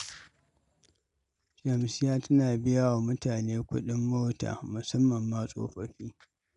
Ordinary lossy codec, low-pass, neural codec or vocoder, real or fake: none; 10.8 kHz; none; real